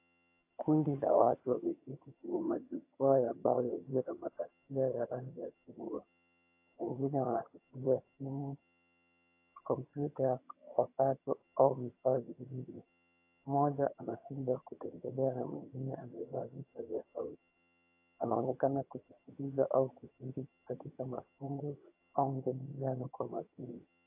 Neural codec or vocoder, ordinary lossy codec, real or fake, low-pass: vocoder, 22.05 kHz, 80 mel bands, HiFi-GAN; AAC, 32 kbps; fake; 3.6 kHz